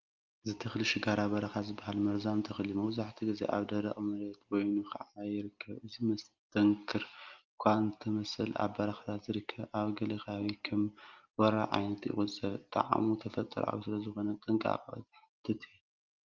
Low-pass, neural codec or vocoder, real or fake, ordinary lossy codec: 7.2 kHz; none; real; Opus, 32 kbps